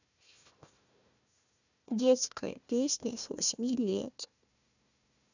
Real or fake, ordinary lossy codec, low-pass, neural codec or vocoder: fake; none; 7.2 kHz; codec, 16 kHz, 1 kbps, FunCodec, trained on Chinese and English, 50 frames a second